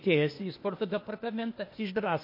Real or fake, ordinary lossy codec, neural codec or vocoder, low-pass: fake; MP3, 32 kbps; codec, 16 kHz, 0.8 kbps, ZipCodec; 5.4 kHz